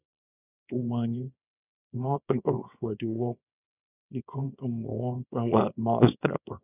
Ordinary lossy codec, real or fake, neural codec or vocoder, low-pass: none; fake; codec, 24 kHz, 0.9 kbps, WavTokenizer, small release; 3.6 kHz